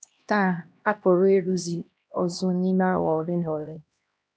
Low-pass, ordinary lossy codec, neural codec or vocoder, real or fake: none; none; codec, 16 kHz, 1 kbps, X-Codec, HuBERT features, trained on LibriSpeech; fake